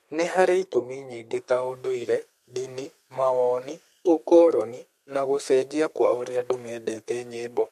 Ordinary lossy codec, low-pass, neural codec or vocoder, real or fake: MP3, 64 kbps; 14.4 kHz; codec, 32 kHz, 1.9 kbps, SNAC; fake